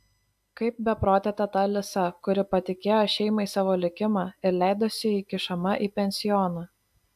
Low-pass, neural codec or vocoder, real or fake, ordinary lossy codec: 14.4 kHz; none; real; AAC, 96 kbps